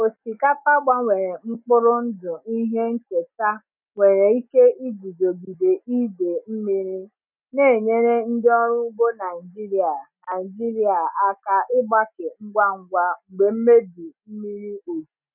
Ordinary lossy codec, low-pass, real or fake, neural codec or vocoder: none; 3.6 kHz; real; none